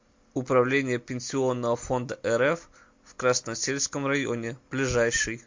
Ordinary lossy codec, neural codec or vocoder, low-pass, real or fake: MP3, 48 kbps; none; 7.2 kHz; real